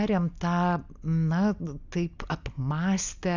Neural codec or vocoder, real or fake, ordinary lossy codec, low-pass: none; real; Opus, 64 kbps; 7.2 kHz